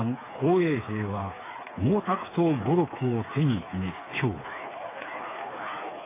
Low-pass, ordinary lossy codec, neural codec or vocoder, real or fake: 3.6 kHz; AAC, 16 kbps; codec, 16 kHz, 4 kbps, FreqCodec, smaller model; fake